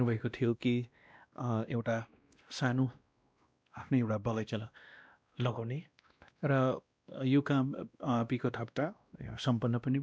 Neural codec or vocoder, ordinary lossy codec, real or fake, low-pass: codec, 16 kHz, 1 kbps, X-Codec, WavLM features, trained on Multilingual LibriSpeech; none; fake; none